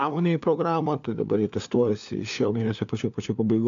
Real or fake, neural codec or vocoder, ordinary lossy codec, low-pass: fake; codec, 16 kHz, 4 kbps, FunCodec, trained on LibriTTS, 50 frames a second; AAC, 64 kbps; 7.2 kHz